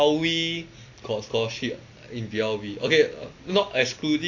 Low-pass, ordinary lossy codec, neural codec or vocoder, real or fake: 7.2 kHz; AAC, 48 kbps; none; real